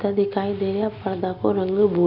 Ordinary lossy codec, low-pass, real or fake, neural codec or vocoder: Opus, 64 kbps; 5.4 kHz; real; none